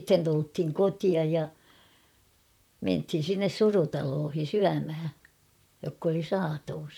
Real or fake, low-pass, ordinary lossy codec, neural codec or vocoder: fake; 19.8 kHz; none; vocoder, 44.1 kHz, 128 mel bands, Pupu-Vocoder